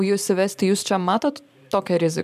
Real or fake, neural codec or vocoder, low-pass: fake; vocoder, 44.1 kHz, 128 mel bands every 512 samples, BigVGAN v2; 14.4 kHz